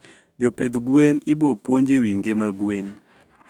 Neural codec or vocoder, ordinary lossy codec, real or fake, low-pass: codec, 44.1 kHz, 2.6 kbps, DAC; none; fake; 19.8 kHz